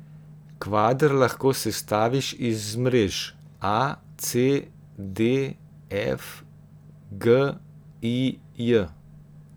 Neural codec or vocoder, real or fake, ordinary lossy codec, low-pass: none; real; none; none